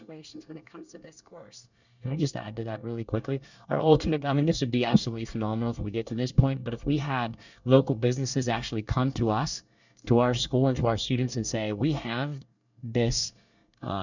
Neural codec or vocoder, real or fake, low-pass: codec, 24 kHz, 1 kbps, SNAC; fake; 7.2 kHz